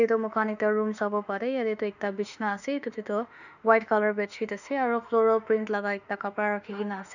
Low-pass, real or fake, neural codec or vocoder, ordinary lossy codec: 7.2 kHz; fake; autoencoder, 48 kHz, 32 numbers a frame, DAC-VAE, trained on Japanese speech; none